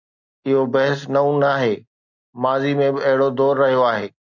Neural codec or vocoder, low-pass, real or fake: none; 7.2 kHz; real